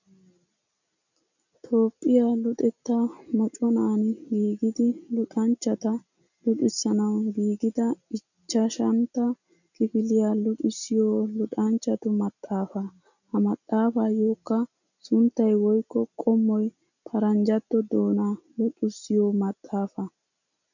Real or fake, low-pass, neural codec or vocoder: real; 7.2 kHz; none